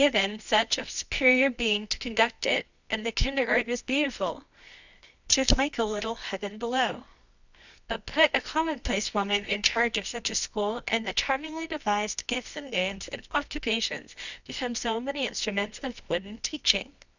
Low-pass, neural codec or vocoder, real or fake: 7.2 kHz; codec, 24 kHz, 0.9 kbps, WavTokenizer, medium music audio release; fake